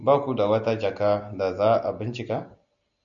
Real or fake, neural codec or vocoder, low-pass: real; none; 7.2 kHz